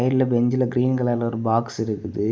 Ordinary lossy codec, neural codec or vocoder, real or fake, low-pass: none; none; real; none